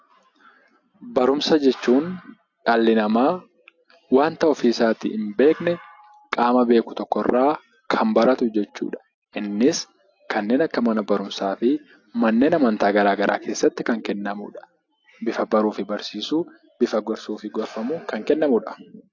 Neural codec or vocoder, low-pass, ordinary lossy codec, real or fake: none; 7.2 kHz; AAC, 48 kbps; real